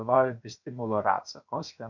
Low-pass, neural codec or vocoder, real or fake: 7.2 kHz; codec, 16 kHz, 0.7 kbps, FocalCodec; fake